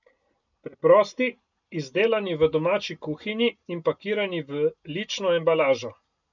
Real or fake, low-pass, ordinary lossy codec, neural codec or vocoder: real; 7.2 kHz; none; none